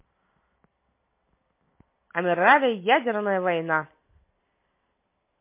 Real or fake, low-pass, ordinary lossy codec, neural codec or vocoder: real; 3.6 kHz; MP3, 24 kbps; none